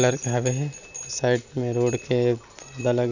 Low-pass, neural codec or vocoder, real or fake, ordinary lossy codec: 7.2 kHz; none; real; none